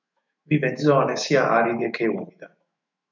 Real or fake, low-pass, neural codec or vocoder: fake; 7.2 kHz; autoencoder, 48 kHz, 128 numbers a frame, DAC-VAE, trained on Japanese speech